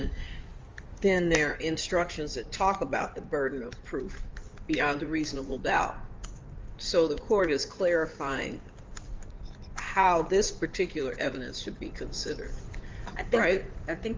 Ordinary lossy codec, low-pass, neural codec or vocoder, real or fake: Opus, 32 kbps; 7.2 kHz; codec, 16 kHz in and 24 kHz out, 2.2 kbps, FireRedTTS-2 codec; fake